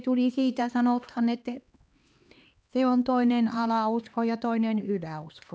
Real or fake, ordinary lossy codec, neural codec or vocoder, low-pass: fake; none; codec, 16 kHz, 2 kbps, X-Codec, HuBERT features, trained on LibriSpeech; none